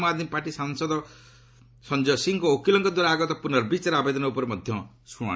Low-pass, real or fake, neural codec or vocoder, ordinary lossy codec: none; real; none; none